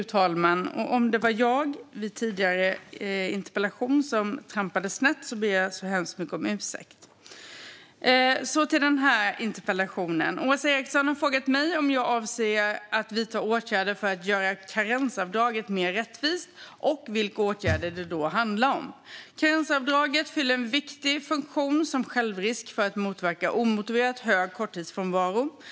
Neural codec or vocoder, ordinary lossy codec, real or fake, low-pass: none; none; real; none